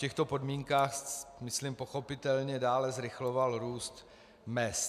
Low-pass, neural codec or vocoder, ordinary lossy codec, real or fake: 14.4 kHz; none; AAC, 96 kbps; real